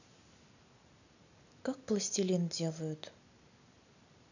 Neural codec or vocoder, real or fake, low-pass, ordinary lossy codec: none; real; 7.2 kHz; none